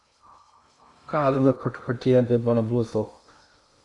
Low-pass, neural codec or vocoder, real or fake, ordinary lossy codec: 10.8 kHz; codec, 16 kHz in and 24 kHz out, 0.6 kbps, FocalCodec, streaming, 2048 codes; fake; Opus, 64 kbps